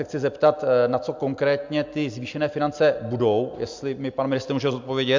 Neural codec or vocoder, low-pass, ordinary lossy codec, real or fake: none; 7.2 kHz; MP3, 64 kbps; real